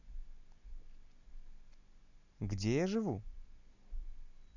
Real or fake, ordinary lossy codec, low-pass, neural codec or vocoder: real; none; 7.2 kHz; none